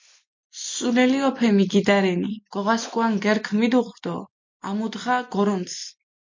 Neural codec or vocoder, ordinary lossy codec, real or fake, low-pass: none; MP3, 48 kbps; real; 7.2 kHz